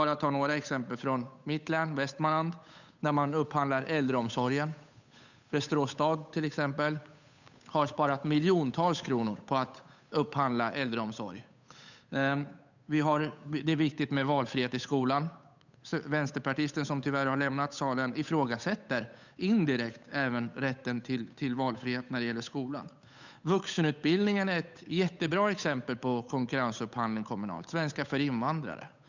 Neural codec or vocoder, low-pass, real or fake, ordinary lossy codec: codec, 16 kHz, 8 kbps, FunCodec, trained on Chinese and English, 25 frames a second; 7.2 kHz; fake; none